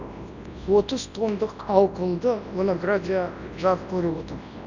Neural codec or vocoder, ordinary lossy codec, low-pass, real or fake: codec, 24 kHz, 0.9 kbps, WavTokenizer, large speech release; none; 7.2 kHz; fake